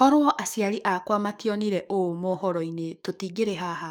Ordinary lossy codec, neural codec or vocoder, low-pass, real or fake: none; codec, 44.1 kHz, 7.8 kbps, DAC; 19.8 kHz; fake